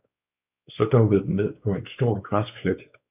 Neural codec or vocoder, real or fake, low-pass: codec, 16 kHz, 4 kbps, X-Codec, WavLM features, trained on Multilingual LibriSpeech; fake; 3.6 kHz